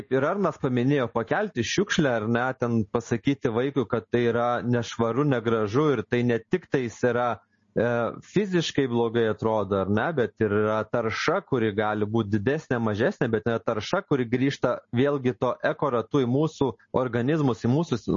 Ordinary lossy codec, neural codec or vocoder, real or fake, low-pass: MP3, 32 kbps; none; real; 7.2 kHz